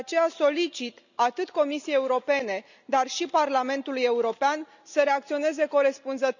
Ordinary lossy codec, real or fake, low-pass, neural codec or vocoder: none; real; 7.2 kHz; none